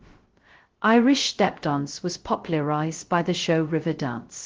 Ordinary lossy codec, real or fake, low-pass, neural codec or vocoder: Opus, 16 kbps; fake; 7.2 kHz; codec, 16 kHz, 0.2 kbps, FocalCodec